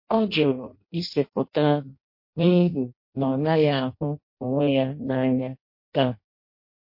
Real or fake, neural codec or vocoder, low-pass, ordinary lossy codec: fake; codec, 16 kHz in and 24 kHz out, 0.6 kbps, FireRedTTS-2 codec; 5.4 kHz; MP3, 32 kbps